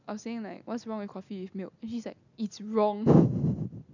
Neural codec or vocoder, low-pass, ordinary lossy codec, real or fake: none; 7.2 kHz; none; real